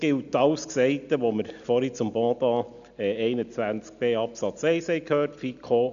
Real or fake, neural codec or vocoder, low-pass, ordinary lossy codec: real; none; 7.2 kHz; none